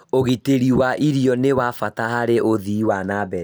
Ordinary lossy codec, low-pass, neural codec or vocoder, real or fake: none; none; none; real